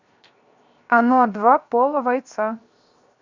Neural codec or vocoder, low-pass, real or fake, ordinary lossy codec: codec, 16 kHz, 0.7 kbps, FocalCodec; 7.2 kHz; fake; Opus, 64 kbps